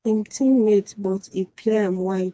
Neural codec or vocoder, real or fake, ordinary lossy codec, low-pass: codec, 16 kHz, 2 kbps, FreqCodec, smaller model; fake; none; none